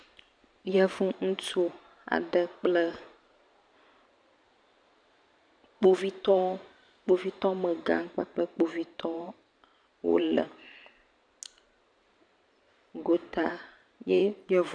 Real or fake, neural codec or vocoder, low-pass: fake; vocoder, 44.1 kHz, 128 mel bands every 512 samples, BigVGAN v2; 9.9 kHz